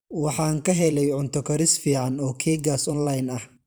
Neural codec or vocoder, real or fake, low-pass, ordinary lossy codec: vocoder, 44.1 kHz, 128 mel bands every 512 samples, BigVGAN v2; fake; none; none